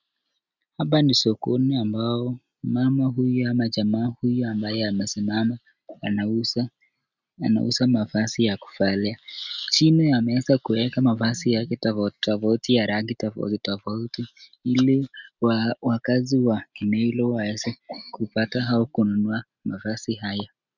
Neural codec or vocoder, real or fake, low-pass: none; real; 7.2 kHz